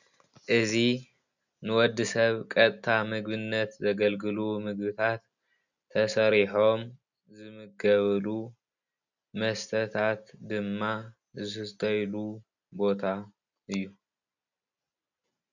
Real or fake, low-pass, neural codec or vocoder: real; 7.2 kHz; none